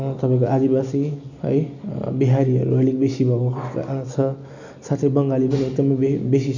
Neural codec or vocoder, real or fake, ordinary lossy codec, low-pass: none; real; none; 7.2 kHz